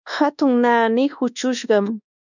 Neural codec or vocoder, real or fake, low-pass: codec, 24 kHz, 1.2 kbps, DualCodec; fake; 7.2 kHz